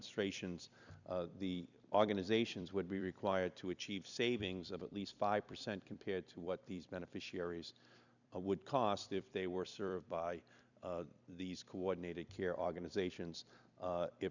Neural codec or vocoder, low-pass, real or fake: vocoder, 44.1 kHz, 128 mel bands every 256 samples, BigVGAN v2; 7.2 kHz; fake